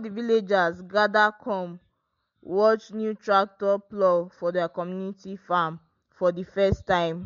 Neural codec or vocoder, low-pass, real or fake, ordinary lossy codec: none; 7.2 kHz; real; MP3, 48 kbps